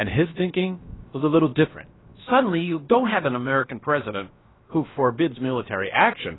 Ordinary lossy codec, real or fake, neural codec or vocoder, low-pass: AAC, 16 kbps; fake; codec, 16 kHz, about 1 kbps, DyCAST, with the encoder's durations; 7.2 kHz